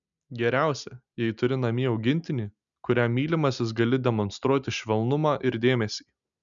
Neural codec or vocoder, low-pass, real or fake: none; 7.2 kHz; real